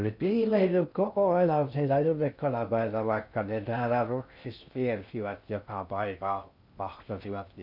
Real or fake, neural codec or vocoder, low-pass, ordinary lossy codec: fake; codec, 16 kHz in and 24 kHz out, 0.6 kbps, FocalCodec, streaming, 2048 codes; 5.4 kHz; none